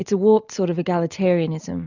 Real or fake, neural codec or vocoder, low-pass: real; none; 7.2 kHz